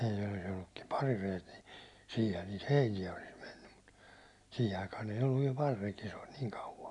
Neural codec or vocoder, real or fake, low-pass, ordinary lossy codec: none; real; 10.8 kHz; none